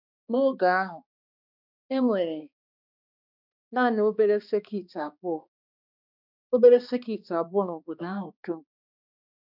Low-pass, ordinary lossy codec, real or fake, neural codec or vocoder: 5.4 kHz; none; fake; codec, 16 kHz, 2 kbps, X-Codec, HuBERT features, trained on balanced general audio